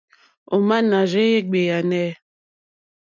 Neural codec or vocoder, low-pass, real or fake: none; 7.2 kHz; real